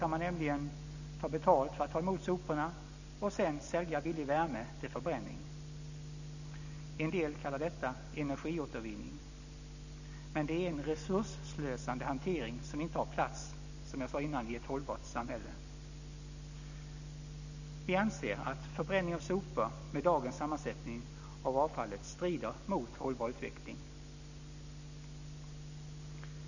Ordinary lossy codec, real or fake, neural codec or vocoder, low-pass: none; real; none; 7.2 kHz